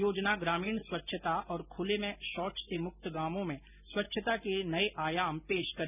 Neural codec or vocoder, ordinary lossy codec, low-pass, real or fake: none; none; 3.6 kHz; real